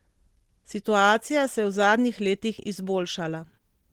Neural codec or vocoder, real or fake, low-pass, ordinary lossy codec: none; real; 19.8 kHz; Opus, 16 kbps